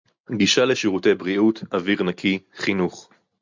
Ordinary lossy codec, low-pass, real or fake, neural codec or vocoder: MP3, 64 kbps; 7.2 kHz; real; none